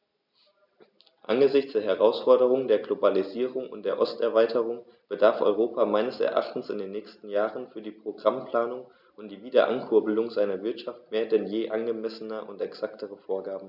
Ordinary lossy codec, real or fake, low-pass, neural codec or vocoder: none; real; 5.4 kHz; none